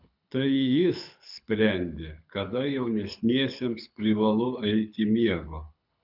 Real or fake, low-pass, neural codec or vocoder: fake; 5.4 kHz; codec, 24 kHz, 6 kbps, HILCodec